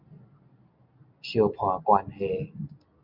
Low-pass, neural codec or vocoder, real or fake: 5.4 kHz; none; real